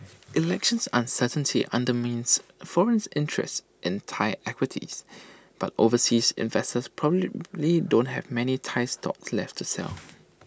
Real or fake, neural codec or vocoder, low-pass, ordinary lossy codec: real; none; none; none